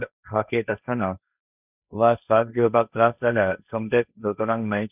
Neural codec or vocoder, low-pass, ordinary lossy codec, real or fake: codec, 16 kHz, 1.1 kbps, Voila-Tokenizer; 3.6 kHz; none; fake